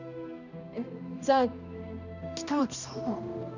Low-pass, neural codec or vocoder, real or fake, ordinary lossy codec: 7.2 kHz; codec, 16 kHz, 1 kbps, X-Codec, HuBERT features, trained on balanced general audio; fake; none